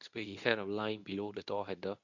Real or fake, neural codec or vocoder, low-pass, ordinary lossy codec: fake; codec, 24 kHz, 0.9 kbps, WavTokenizer, medium speech release version 2; 7.2 kHz; none